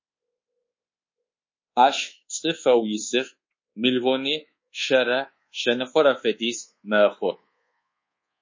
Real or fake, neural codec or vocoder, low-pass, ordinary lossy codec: fake; codec, 24 kHz, 1.2 kbps, DualCodec; 7.2 kHz; MP3, 32 kbps